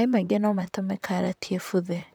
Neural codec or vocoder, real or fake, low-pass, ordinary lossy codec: vocoder, 44.1 kHz, 128 mel bands, Pupu-Vocoder; fake; none; none